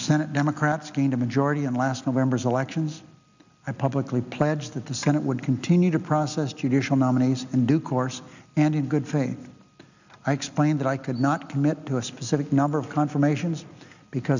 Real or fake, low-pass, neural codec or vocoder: real; 7.2 kHz; none